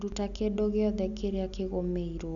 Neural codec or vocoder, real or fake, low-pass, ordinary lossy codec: none; real; 7.2 kHz; none